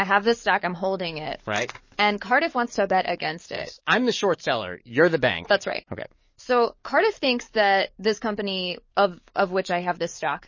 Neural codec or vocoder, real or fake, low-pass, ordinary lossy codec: codec, 44.1 kHz, 7.8 kbps, DAC; fake; 7.2 kHz; MP3, 32 kbps